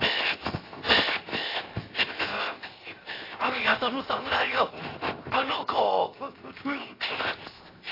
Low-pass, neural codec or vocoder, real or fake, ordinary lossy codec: 5.4 kHz; codec, 16 kHz, 0.7 kbps, FocalCodec; fake; AAC, 24 kbps